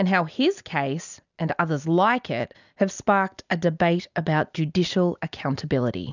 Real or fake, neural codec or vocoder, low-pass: real; none; 7.2 kHz